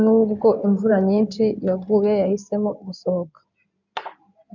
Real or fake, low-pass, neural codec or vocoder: fake; 7.2 kHz; codec, 16 kHz in and 24 kHz out, 2.2 kbps, FireRedTTS-2 codec